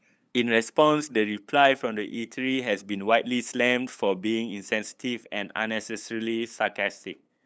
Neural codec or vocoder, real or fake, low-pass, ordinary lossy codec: codec, 16 kHz, 8 kbps, FunCodec, trained on LibriTTS, 25 frames a second; fake; none; none